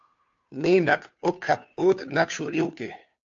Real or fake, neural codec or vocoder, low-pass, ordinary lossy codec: fake; codec, 16 kHz, 2 kbps, FunCodec, trained on Chinese and English, 25 frames a second; 7.2 kHz; AAC, 48 kbps